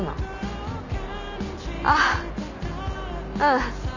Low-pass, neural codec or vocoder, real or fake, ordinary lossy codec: 7.2 kHz; none; real; none